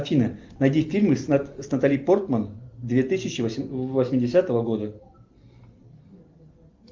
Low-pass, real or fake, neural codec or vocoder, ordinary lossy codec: 7.2 kHz; real; none; Opus, 24 kbps